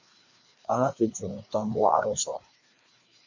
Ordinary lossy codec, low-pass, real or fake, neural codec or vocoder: Opus, 64 kbps; 7.2 kHz; fake; codec, 16 kHz, 2 kbps, FreqCodec, larger model